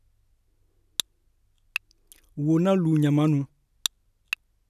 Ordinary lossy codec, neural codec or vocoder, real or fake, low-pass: none; none; real; 14.4 kHz